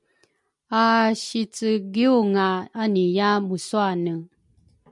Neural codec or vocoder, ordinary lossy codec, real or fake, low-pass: none; MP3, 96 kbps; real; 10.8 kHz